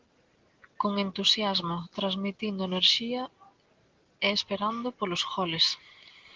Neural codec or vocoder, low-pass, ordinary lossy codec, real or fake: none; 7.2 kHz; Opus, 16 kbps; real